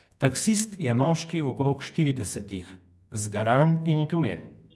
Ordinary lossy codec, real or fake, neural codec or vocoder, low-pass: none; fake; codec, 24 kHz, 0.9 kbps, WavTokenizer, medium music audio release; none